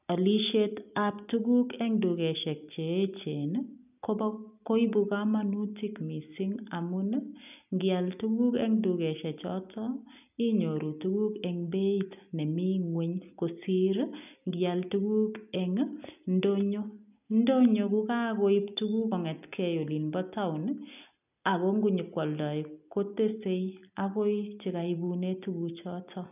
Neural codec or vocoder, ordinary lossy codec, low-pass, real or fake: none; none; 3.6 kHz; real